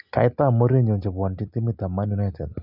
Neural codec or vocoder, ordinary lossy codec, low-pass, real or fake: none; none; 5.4 kHz; real